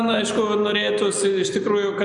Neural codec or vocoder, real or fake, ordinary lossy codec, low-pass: none; real; MP3, 96 kbps; 9.9 kHz